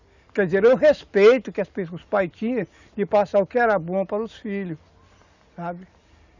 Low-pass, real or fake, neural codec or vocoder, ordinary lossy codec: 7.2 kHz; real; none; none